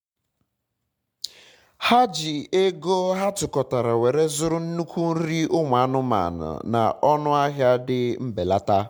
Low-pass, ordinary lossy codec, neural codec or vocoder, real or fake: 19.8 kHz; MP3, 96 kbps; none; real